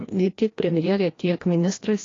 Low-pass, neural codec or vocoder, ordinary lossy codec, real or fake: 7.2 kHz; codec, 16 kHz, 1 kbps, FreqCodec, larger model; AAC, 32 kbps; fake